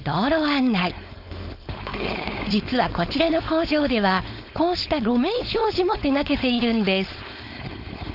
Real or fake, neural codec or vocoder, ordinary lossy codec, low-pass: fake; codec, 16 kHz, 4.8 kbps, FACodec; none; 5.4 kHz